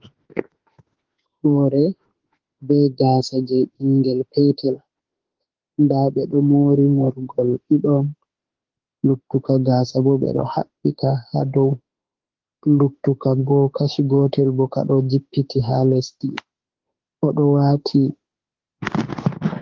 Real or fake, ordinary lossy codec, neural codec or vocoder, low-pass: fake; Opus, 16 kbps; autoencoder, 48 kHz, 32 numbers a frame, DAC-VAE, trained on Japanese speech; 7.2 kHz